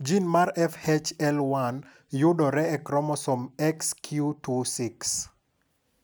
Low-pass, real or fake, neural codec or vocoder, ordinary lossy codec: none; real; none; none